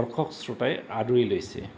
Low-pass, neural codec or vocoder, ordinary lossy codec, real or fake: none; none; none; real